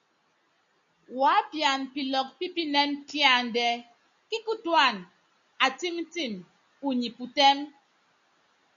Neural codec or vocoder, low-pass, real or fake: none; 7.2 kHz; real